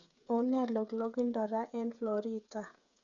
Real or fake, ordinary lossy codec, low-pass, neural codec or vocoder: fake; none; 7.2 kHz; codec, 16 kHz, 8 kbps, FreqCodec, smaller model